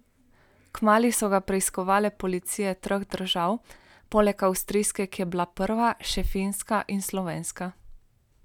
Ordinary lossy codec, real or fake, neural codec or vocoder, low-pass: none; real; none; 19.8 kHz